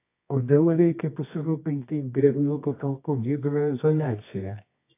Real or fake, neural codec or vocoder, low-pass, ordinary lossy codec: fake; codec, 24 kHz, 0.9 kbps, WavTokenizer, medium music audio release; 3.6 kHz; AAC, 24 kbps